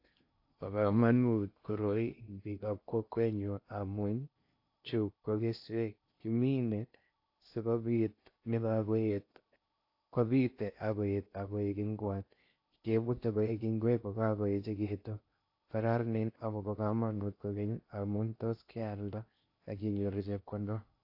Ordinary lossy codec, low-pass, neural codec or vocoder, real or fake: AAC, 32 kbps; 5.4 kHz; codec, 16 kHz in and 24 kHz out, 0.6 kbps, FocalCodec, streaming, 2048 codes; fake